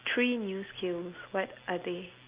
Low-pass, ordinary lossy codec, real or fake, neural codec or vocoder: 3.6 kHz; Opus, 24 kbps; real; none